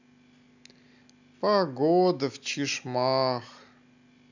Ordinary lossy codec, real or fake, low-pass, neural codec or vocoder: none; real; 7.2 kHz; none